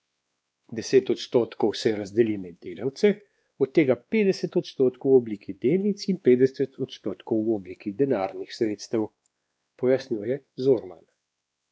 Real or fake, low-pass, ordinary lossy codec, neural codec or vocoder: fake; none; none; codec, 16 kHz, 2 kbps, X-Codec, WavLM features, trained on Multilingual LibriSpeech